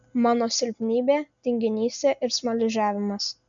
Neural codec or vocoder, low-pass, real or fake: none; 7.2 kHz; real